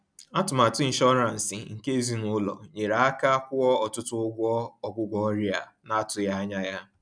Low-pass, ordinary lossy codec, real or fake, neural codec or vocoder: 9.9 kHz; none; real; none